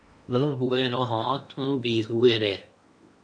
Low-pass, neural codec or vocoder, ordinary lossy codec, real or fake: 9.9 kHz; codec, 16 kHz in and 24 kHz out, 0.8 kbps, FocalCodec, streaming, 65536 codes; AAC, 64 kbps; fake